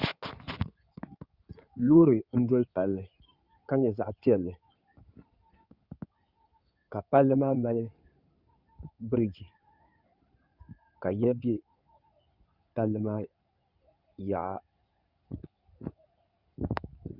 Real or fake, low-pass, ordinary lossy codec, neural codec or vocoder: fake; 5.4 kHz; Opus, 64 kbps; codec, 16 kHz in and 24 kHz out, 2.2 kbps, FireRedTTS-2 codec